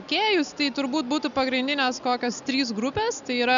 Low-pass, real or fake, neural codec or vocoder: 7.2 kHz; real; none